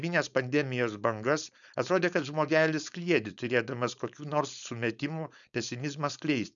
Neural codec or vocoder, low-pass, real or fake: codec, 16 kHz, 4.8 kbps, FACodec; 7.2 kHz; fake